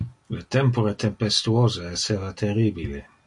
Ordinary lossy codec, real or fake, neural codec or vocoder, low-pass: MP3, 48 kbps; real; none; 10.8 kHz